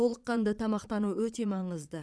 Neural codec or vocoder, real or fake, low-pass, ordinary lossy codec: vocoder, 22.05 kHz, 80 mel bands, Vocos; fake; none; none